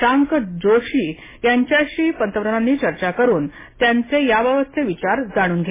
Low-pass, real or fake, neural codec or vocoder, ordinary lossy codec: 3.6 kHz; real; none; MP3, 16 kbps